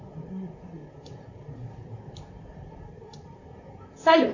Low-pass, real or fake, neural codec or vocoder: 7.2 kHz; fake; vocoder, 44.1 kHz, 128 mel bands, Pupu-Vocoder